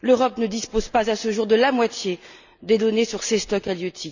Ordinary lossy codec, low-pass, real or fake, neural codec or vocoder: none; 7.2 kHz; real; none